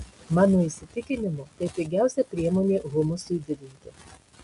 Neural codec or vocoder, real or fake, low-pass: none; real; 10.8 kHz